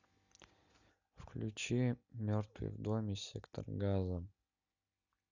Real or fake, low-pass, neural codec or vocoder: real; 7.2 kHz; none